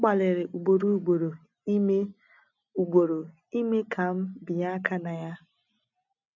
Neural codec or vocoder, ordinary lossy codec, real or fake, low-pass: none; none; real; 7.2 kHz